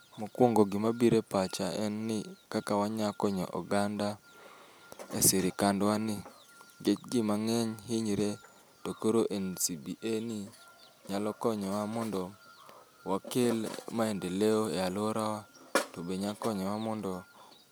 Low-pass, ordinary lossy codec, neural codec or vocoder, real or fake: none; none; none; real